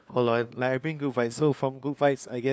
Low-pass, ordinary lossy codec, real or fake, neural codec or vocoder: none; none; fake; codec, 16 kHz, 2 kbps, FunCodec, trained on LibriTTS, 25 frames a second